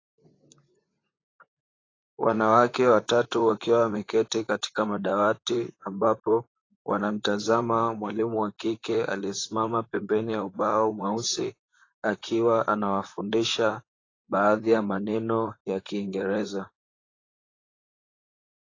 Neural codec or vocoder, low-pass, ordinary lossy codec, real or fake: vocoder, 44.1 kHz, 128 mel bands, Pupu-Vocoder; 7.2 kHz; AAC, 32 kbps; fake